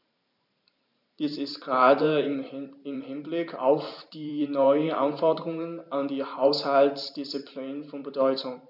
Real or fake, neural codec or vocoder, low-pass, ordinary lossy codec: fake; vocoder, 44.1 kHz, 128 mel bands every 512 samples, BigVGAN v2; 5.4 kHz; none